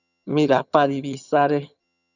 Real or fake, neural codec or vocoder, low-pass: fake; vocoder, 22.05 kHz, 80 mel bands, HiFi-GAN; 7.2 kHz